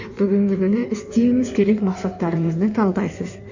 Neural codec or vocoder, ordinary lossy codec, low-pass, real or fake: codec, 16 kHz in and 24 kHz out, 1.1 kbps, FireRedTTS-2 codec; none; 7.2 kHz; fake